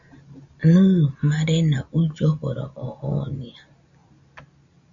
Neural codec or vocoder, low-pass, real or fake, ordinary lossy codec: none; 7.2 kHz; real; AAC, 64 kbps